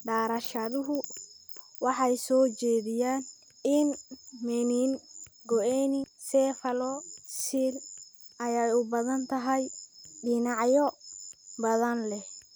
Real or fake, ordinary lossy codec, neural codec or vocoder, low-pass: real; none; none; none